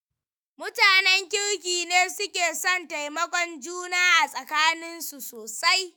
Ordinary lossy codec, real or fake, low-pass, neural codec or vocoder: none; fake; none; autoencoder, 48 kHz, 128 numbers a frame, DAC-VAE, trained on Japanese speech